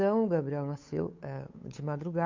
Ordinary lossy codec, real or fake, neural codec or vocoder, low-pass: MP3, 48 kbps; fake; codec, 16 kHz, 8 kbps, FunCodec, trained on Chinese and English, 25 frames a second; 7.2 kHz